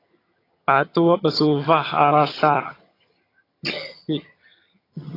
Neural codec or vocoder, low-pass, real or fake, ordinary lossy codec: vocoder, 22.05 kHz, 80 mel bands, HiFi-GAN; 5.4 kHz; fake; AAC, 24 kbps